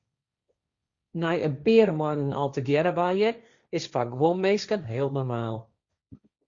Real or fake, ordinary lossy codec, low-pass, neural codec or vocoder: fake; Opus, 64 kbps; 7.2 kHz; codec, 16 kHz, 1.1 kbps, Voila-Tokenizer